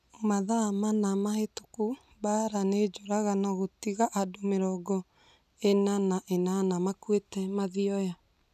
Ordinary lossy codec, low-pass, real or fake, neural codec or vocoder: none; 14.4 kHz; real; none